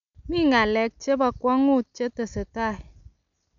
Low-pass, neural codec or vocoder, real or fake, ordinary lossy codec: 7.2 kHz; none; real; none